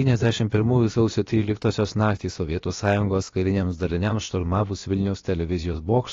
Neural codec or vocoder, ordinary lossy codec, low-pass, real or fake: codec, 16 kHz, about 1 kbps, DyCAST, with the encoder's durations; AAC, 32 kbps; 7.2 kHz; fake